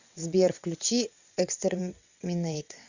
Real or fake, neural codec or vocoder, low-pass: fake; vocoder, 22.05 kHz, 80 mel bands, WaveNeXt; 7.2 kHz